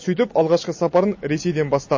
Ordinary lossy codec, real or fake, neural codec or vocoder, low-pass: MP3, 32 kbps; real; none; 7.2 kHz